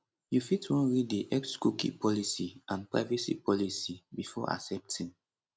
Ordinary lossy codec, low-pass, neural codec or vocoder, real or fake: none; none; none; real